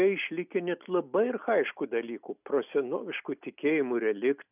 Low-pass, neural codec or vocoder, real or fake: 3.6 kHz; none; real